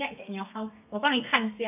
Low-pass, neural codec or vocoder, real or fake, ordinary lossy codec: 3.6 kHz; codec, 32 kHz, 1.9 kbps, SNAC; fake; none